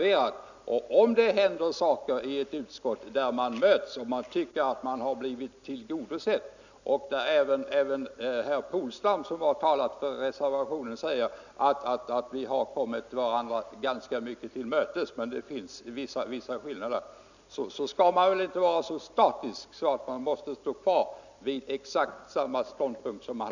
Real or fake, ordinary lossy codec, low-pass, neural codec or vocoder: real; none; 7.2 kHz; none